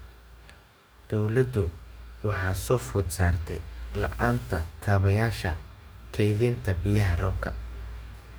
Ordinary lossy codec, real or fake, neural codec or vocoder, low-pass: none; fake; codec, 44.1 kHz, 2.6 kbps, DAC; none